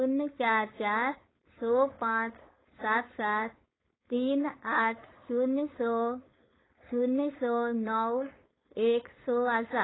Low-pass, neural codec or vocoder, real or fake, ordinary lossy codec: 7.2 kHz; codec, 16 kHz, 4.8 kbps, FACodec; fake; AAC, 16 kbps